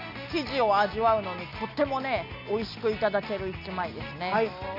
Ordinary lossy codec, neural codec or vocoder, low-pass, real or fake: none; none; 5.4 kHz; real